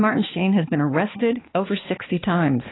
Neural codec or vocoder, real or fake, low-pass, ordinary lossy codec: codec, 16 kHz, 2 kbps, X-Codec, HuBERT features, trained on balanced general audio; fake; 7.2 kHz; AAC, 16 kbps